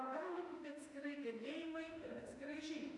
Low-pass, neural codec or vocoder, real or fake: 10.8 kHz; autoencoder, 48 kHz, 32 numbers a frame, DAC-VAE, trained on Japanese speech; fake